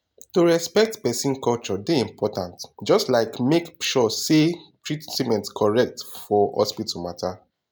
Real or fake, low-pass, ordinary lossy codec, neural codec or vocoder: real; none; none; none